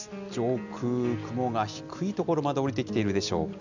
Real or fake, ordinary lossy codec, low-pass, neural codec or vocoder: real; none; 7.2 kHz; none